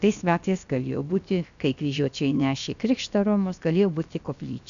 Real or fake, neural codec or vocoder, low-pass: fake; codec, 16 kHz, about 1 kbps, DyCAST, with the encoder's durations; 7.2 kHz